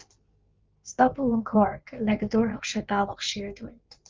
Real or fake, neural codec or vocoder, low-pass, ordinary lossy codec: fake; codec, 16 kHz in and 24 kHz out, 1.1 kbps, FireRedTTS-2 codec; 7.2 kHz; Opus, 32 kbps